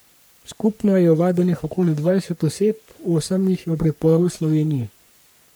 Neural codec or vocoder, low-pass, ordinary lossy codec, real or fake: codec, 44.1 kHz, 3.4 kbps, Pupu-Codec; none; none; fake